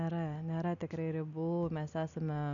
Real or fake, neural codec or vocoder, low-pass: real; none; 7.2 kHz